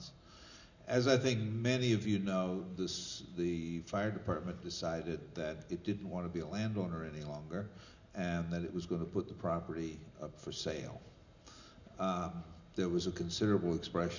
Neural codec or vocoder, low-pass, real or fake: none; 7.2 kHz; real